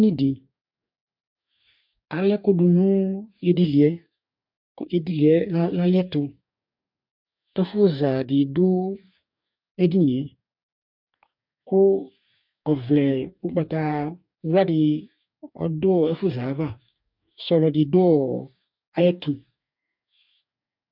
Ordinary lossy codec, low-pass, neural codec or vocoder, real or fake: MP3, 48 kbps; 5.4 kHz; codec, 44.1 kHz, 2.6 kbps, DAC; fake